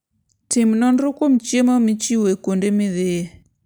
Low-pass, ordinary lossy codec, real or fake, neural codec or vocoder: none; none; real; none